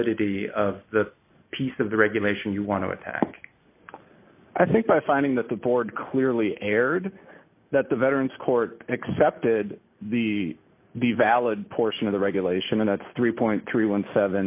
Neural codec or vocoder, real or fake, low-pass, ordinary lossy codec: none; real; 3.6 kHz; AAC, 32 kbps